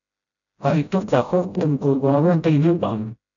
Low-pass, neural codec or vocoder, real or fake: 7.2 kHz; codec, 16 kHz, 0.5 kbps, FreqCodec, smaller model; fake